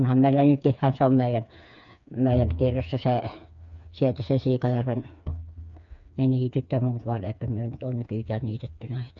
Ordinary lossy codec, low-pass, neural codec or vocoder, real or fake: MP3, 96 kbps; 7.2 kHz; codec, 16 kHz, 4 kbps, FreqCodec, smaller model; fake